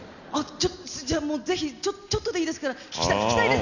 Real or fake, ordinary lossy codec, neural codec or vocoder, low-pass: real; none; none; 7.2 kHz